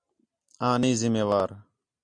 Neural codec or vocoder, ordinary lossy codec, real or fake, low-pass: none; MP3, 64 kbps; real; 9.9 kHz